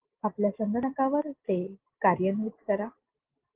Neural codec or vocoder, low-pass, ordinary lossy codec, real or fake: none; 3.6 kHz; Opus, 16 kbps; real